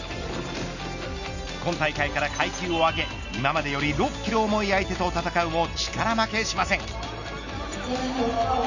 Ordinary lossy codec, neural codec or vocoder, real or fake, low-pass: none; none; real; 7.2 kHz